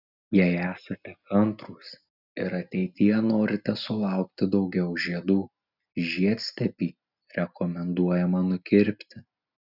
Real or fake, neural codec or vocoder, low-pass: real; none; 5.4 kHz